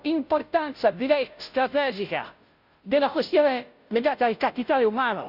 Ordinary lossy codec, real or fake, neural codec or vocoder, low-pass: none; fake; codec, 16 kHz, 0.5 kbps, FunCodec, trained on Chinese and English, 25 frames a second; 5.4 kHz